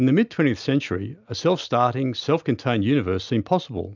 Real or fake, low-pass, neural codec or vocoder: real; 7.2 kHz; none